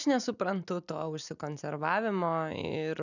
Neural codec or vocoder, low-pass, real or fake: none; 7.2 kHz; real